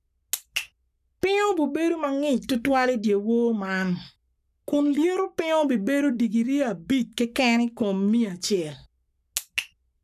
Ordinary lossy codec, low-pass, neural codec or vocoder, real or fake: none; 14.4 kHz; codec, 44.1 kHz, 7.8 kbps, Pupu-Codec; fake